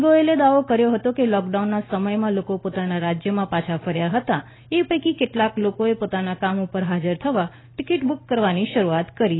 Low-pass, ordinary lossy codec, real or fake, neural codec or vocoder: 7.2 kHz; AAC, 16 kbps; real; none